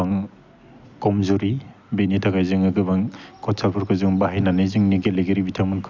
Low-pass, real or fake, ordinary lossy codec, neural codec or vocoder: 7.2 kHz; real; none; none